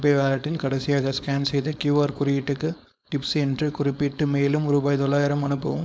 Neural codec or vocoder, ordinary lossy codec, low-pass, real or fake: codec, 16 kHz, 4.8 kbps, FACodec; none; none; fake